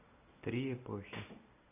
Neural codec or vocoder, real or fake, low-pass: none; real; 3.6 kHz